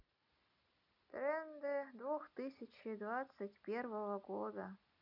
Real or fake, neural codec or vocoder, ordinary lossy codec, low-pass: real; none; none; 5.4 kHz